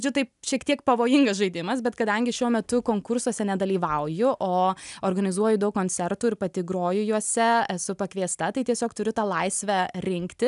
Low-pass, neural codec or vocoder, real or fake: 10.8 kHz; none; real